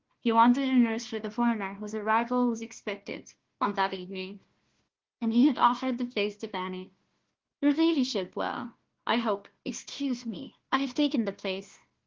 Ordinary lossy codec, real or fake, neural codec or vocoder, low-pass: Opus, 16 kbps; fake; codec, 16 kHz, 1 kbps, FunCodec, trained on Chinese and English, 50 frames a second; 7.2 kHz